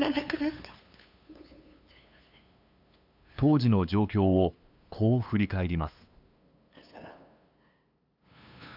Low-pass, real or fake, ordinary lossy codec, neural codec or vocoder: 5.4 kHz; fake; none; codec, 16 kHz, 2 kbps, FunCodec, trained on LibriTTS, 25 frames a second